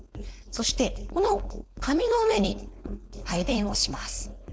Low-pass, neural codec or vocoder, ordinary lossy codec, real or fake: none; codec, 16 kHz, 4.8 kbps, FACodec; none; fake